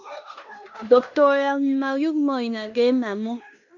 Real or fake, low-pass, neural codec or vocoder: fake; 7.2 kHz; codec, 16 kHz in and 24 kHz out, 0.9 kbps, LongCat-Audio-Codec, fine tuned four codebook decoder